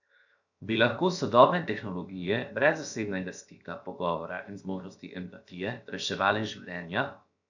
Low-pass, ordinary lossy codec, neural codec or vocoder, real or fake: 7.2 kHz; none; codec, 16 kHz, 0.7 kbps, FocalCodec; fake